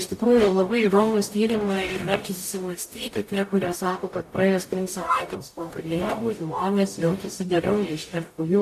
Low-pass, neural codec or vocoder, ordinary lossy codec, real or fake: 14.4 kHz; codec, 44.1 kHz, 0.9 kbps, DAC; AAC, 64 kbps; fake